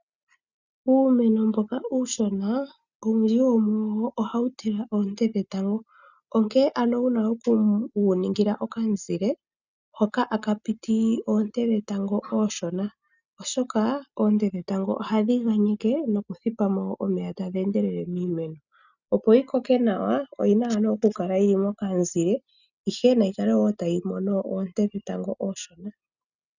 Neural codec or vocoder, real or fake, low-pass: none; real; 7.2 kHz